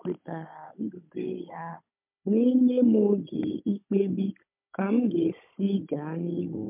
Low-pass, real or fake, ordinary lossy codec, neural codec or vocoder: 3.6 kHz; fake; MP3, 32 kbps; codec, 16 kHz, 16 kbps, FunCodec, trained on Chinese and English, 50 frames a second